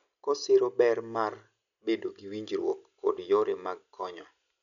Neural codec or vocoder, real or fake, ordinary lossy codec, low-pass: none; real; none; 7.2 kHz